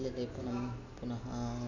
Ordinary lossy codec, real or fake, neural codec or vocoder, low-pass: none; real; none; 7.2 kHz